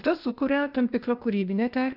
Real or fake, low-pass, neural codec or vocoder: fake; 5.4 kHz; codec, 16 kHz, 0.8 kbps, ZipCodec